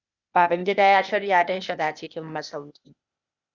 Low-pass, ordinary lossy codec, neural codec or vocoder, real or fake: 7.2 kHz; Opus, 64 kbps; codec, 16 kHz, 0.8 kbps, ZipCodec; fake